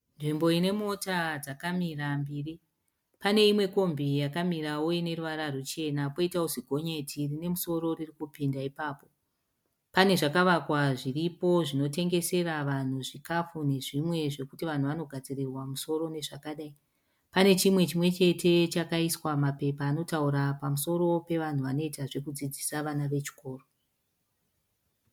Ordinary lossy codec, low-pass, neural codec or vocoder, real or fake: MP3, 96 kbps; 19.8 kHz; none; real